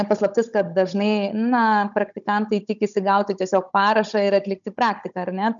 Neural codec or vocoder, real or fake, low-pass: codec, 16 kHz, 16 kbps, FunCodec, trained on Chinese and English, 50 frames a second; fake; 7.2 kHz